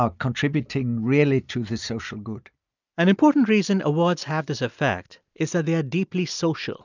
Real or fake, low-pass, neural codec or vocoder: real; 7.2 kHz; none